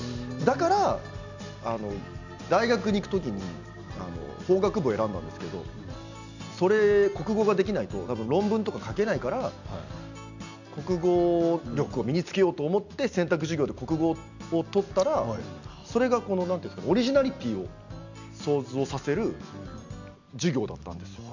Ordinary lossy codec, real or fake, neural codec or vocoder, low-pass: none; real; none; 7.2 kHz